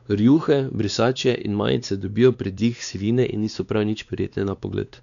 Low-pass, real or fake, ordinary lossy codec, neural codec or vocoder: 7.2 kHz; fake; none; codec, 16 kHz, 2 kbps, X-Codec, WavLM features, trained on Multilingual LibriSpeech